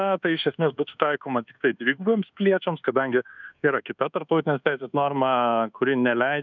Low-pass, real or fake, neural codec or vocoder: 7.2 kHz; fake; codec, 24 kHz, 1.2 kbps, DualCodec